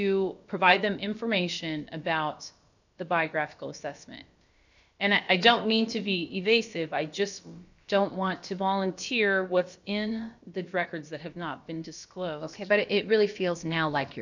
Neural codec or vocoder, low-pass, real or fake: codec, 16 kHz, about 1 kbps, DyCAST, with the encoder's durations; 7.2 kHz; fake